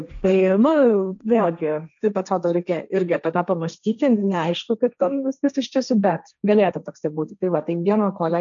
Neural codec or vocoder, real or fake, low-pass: codec, 16 kHz, 1.1 kbps, Voila-Tokenizer; fake; 7.2 kHz